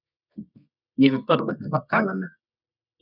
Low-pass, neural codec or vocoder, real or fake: 5.4 kHz; codec, 24 kHz, 0.9 kbps, WavTokenizer, medium music audio release; fake